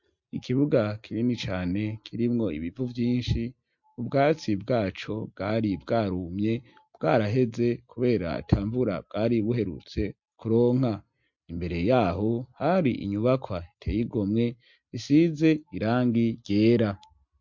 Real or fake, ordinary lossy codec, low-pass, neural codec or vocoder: real; MP3, 48 kbps; 7.2 kHz; none